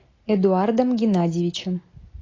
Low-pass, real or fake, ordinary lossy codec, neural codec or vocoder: 7.2 kHz; real; AAC, 32 kbps; none